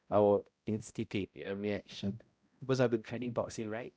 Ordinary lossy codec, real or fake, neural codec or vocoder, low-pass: none; fake; codec, 16 kHz, 0.5 kbps, X-Codec, HuBERT features, trained on balanced general audio; none